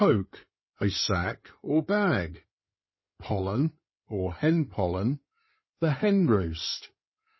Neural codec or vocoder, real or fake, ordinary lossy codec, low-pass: codec, 16 kHz in and 24 kHz out, 2.2 kbps, FireRedTTS-2 codec; fake; MP3, 24 kbps; 7.2 kHz